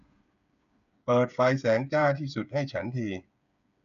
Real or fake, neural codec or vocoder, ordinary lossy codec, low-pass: fake; codec, 16 kHz, 8 kbps, FreqCodec, smaller model; none; 7.2 kHz